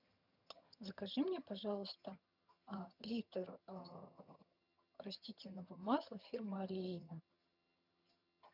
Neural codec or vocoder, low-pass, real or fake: vocoder, 22.05 kHz, 80 mel bands, HiFi-GAN; 5.4 kHz; fake